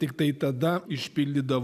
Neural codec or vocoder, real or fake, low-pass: none; real; 14.4 kHz